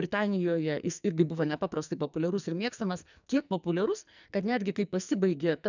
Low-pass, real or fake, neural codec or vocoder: 7.2 kHz; fake; codec, 44.1 kHz, 2.6 kbps, SNAC